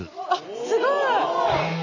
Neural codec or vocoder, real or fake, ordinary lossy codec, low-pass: none; real; AAC, 48 kbps; 7.2 kHz